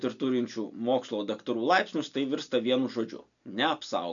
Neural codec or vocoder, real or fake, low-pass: none; real; 7.2 kHz